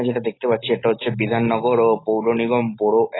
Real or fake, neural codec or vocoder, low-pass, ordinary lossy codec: real; none; 7.2 kHz; AAC, 16 kbps